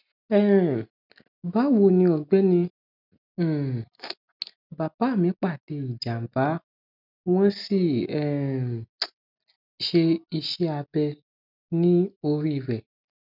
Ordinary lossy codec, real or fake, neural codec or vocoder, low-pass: none; real; none; 5.4 kHz